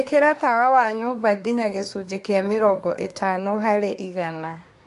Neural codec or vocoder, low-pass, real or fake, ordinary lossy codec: codec, 24 kHz, 1 kbps, SNAC; 10.8 kHz; fake; AAC, 64 kbps